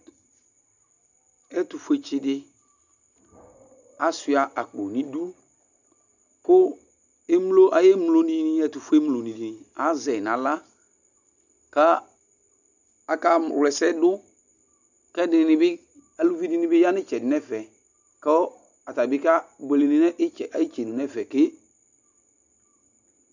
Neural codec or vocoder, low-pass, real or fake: none; 7.2 kHz; real